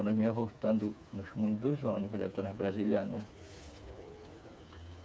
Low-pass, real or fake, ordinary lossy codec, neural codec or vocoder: none; fake; none; codec, 16 kHz, 4 kbps, FreqCodec, smaller model